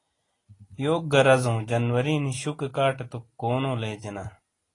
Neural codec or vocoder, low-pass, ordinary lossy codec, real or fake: none; 10.8 kHz; AAC, 32 kbps; real